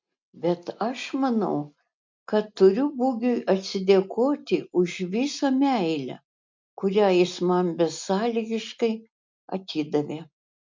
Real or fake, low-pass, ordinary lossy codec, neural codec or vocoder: real; 7.2 kHz; MP3, 64 kbps; none